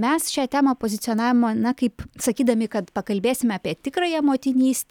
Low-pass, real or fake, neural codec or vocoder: 19.8 kHz; real; none